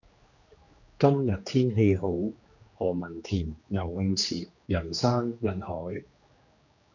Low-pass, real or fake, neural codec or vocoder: 7.2 kHz; fake; codec, 16 kHz, 2 kbps, X-Codec, HuBERT features, trained on general audio